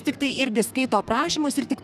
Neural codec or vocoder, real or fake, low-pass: codec, 32 kHz, 1.9 kbps, SNAC; fake; 14.4 kHz